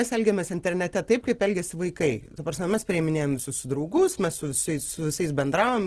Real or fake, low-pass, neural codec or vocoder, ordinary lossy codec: real; 10.8 kHz; none; Opus, 16 kbps